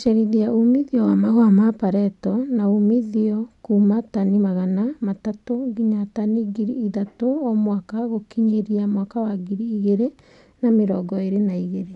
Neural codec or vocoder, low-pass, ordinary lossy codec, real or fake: none; 10.8 kHz; none; real